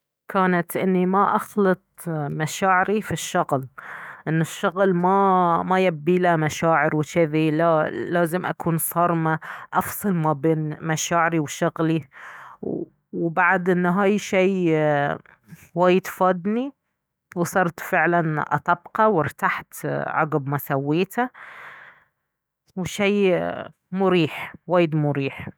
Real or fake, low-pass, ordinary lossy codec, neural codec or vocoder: fake; none; none; autoencoder, 48 kHz, 128 numbers a frame, DAC-VAE, trained on Japanese speech